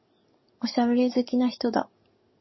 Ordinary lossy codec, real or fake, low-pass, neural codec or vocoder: MP3, 24 kbps; fake; 7.2 kHz; codec, 44.1 kHz, 7.8 kbps, DAC